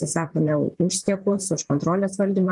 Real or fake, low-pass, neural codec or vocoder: fake; 10.8 kHz; vocoder, 44.1 kHz, 128 mel bands, Pupu-Vocoder